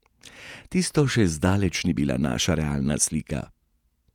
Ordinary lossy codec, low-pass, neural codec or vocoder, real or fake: none; 19.8 kHz; vocoder, 48 kHz, 128 mel bands, Vocos; fake